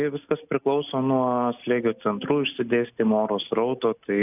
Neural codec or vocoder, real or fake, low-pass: none; real; 3.6 kHz